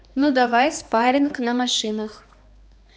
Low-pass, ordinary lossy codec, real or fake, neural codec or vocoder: none; none; fake; codec, 16 kHz, 2 kbps, X-Codec, HuBERT features, trained on balanced general audio